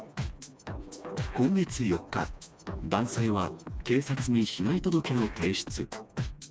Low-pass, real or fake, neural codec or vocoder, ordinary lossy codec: none; fake; codec, 16 kHz, 2 kbps, FreqCodec, smaller model; none